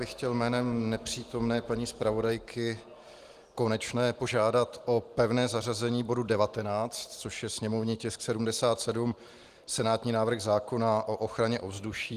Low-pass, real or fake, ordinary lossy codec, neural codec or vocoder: 14.4 kHz; real; Opus, 24 kbps; none